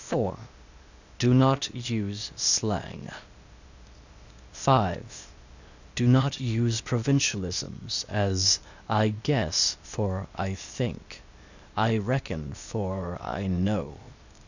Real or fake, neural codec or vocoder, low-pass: fake; codec, 16 kHz, 0.8 kbps, ZipCodec; 7.2 kHz